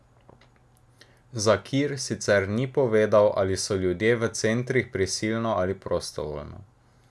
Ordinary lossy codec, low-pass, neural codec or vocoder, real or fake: none; none; none; real